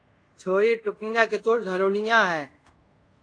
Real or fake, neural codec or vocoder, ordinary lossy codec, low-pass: fake; codec, 24 kHz, 0.5 kbps, DualCodec; AAC, 48 kbps; 9.9 kHz